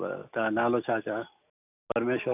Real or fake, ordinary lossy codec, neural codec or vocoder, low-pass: real; none; none; 3.6 kHz